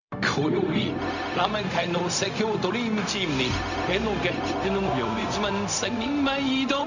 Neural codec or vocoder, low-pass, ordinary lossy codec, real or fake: codec, 16 kHz, 0.4 kbps, LongCat-Audio-Codec; 7.2 kHz; none; fake